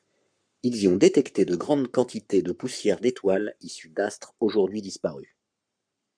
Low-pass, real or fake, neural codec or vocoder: 9.9 kHz; fake; codec, 44.1 kHz, 7.8 kbps, Pupu-Codec